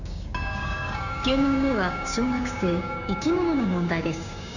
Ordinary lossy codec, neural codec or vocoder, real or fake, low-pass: none; codec, 16 kHz, 6 kbps, DAC; fake; 7.2 kHz